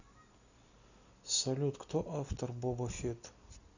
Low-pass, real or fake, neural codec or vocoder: 7.2 kHz; real; none